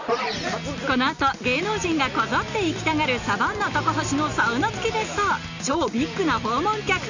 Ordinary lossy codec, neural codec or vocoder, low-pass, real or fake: none; none; 7.2 kHz; real